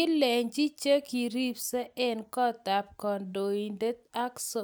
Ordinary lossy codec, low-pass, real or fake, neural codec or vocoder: none; none; real; none